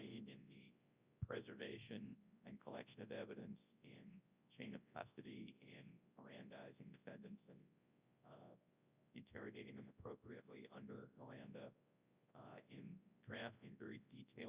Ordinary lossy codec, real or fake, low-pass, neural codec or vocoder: Opus, 64 kbps; fake; 3.6 kHz; codec, 24 kHz, 0.9 kbps, WavTokenizer, large speech release